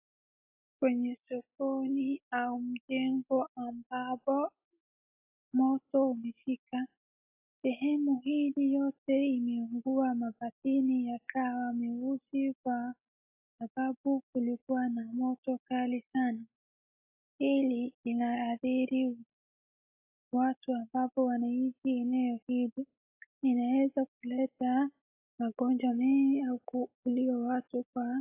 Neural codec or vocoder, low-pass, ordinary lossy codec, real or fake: none; 3.6 kHz; AAC, 32 kbps; real